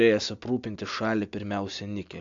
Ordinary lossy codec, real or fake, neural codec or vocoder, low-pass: AAC, 64 kbps; real; none; 7.2 kHz